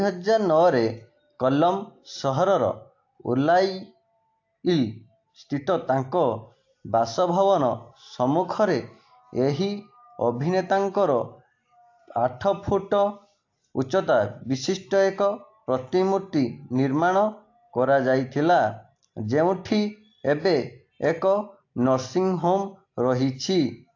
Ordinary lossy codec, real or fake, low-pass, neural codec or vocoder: AAC, 48 kbps; real; 7.2 kHz; none